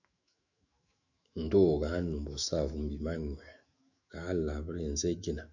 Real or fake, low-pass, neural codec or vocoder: fake; 7.2 kHz; autoencoder, 48 kHz, 128 numbers a frame, DAC-VAE, trained on Japanese speech